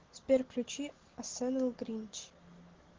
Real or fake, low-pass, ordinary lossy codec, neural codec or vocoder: real; 7.2 kHz; Opus, 16 kbps; none